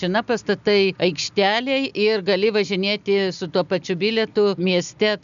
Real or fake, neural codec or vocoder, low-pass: real; none; 7.2 kHz